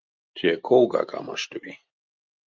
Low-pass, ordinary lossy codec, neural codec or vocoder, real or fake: 7.2 kHz; Opus, 24 kbps; none; real